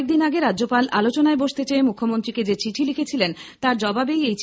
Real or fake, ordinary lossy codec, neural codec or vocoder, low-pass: real; none; none; none